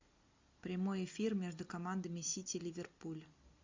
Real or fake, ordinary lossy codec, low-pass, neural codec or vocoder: real; MP3, 64 kbps; 7.2 kHz; none